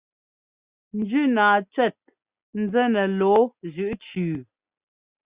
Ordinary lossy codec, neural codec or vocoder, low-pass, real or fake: Opus, 64 kbps; none; 3.6 kHz; real